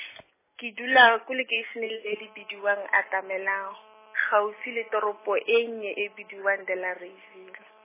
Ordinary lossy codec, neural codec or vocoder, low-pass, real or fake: MP3, 16 kbps; none; 3.6 kHz; real